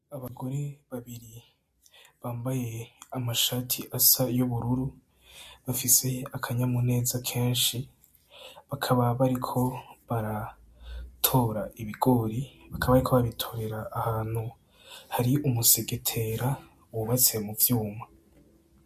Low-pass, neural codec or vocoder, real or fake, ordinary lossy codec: 14.4 kHz; none; real; MP3, 64 kbps